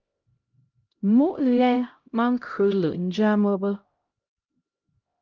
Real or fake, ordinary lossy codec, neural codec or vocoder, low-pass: fake; Opus, 32 kbps; codec, 16 kHz, 0.5 kbps, X-Codec, HuBERT features, trained on LibriSpeech; 7.2 kHz